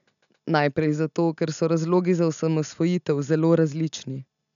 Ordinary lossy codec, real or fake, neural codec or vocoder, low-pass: none; real; none; 7.2 kHz